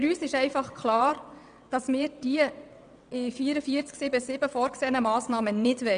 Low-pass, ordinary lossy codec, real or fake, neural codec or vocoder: 9.9 kHz; none; fake; vocoder, 22.05 kHz, 80 mel bands, WaveNeXt